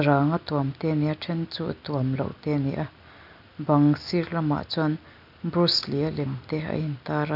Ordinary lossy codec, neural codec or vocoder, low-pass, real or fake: none; none; 5.4 kHz; real